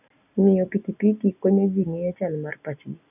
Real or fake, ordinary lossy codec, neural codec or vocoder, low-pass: real; none; none; 3.6 kHz